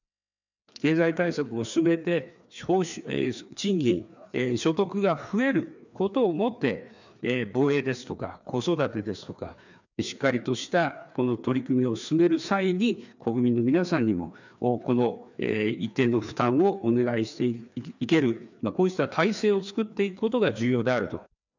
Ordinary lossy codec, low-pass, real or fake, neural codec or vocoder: none; 7.2 kHz; fake; codec, 16 kHz, 2 kbps, FreqCodec, larger model